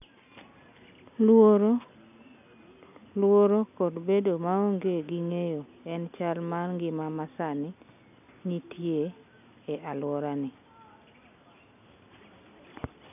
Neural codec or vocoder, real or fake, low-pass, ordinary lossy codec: none; real; 3.6 kHz; none